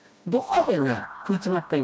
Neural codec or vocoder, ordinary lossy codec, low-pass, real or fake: codec, 16 kHz, 1 kbps, FreqCodec, smaller model; none; none; fake